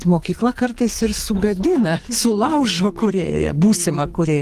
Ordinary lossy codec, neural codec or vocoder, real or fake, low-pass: Opus, 24 kbps; codec, 44.1 kHz, 2.6 kbps, SNAC; fake; 14.4 kHz